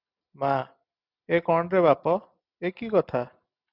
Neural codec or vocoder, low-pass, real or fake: none; 5.4 kHz; real